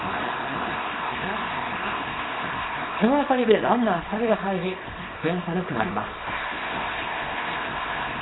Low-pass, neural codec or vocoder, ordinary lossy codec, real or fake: 7.2 kHz; codec, 24 kHz, 0.9 kbps, WavTokenizer, small release; AAC, 16 kbps; fake